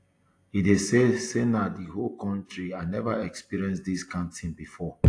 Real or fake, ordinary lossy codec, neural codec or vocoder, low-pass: real; AAC, 48 kbps; none; 9.9 kHz